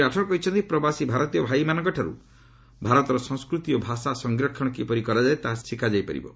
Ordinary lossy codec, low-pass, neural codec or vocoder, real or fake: none; none; none; real